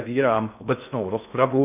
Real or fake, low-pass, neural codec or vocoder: fake; 3.6 kHz; codec, 16 kHz in and 24 kHz out, 0.6 kbps, FocalCodec, streaming, 2048 codes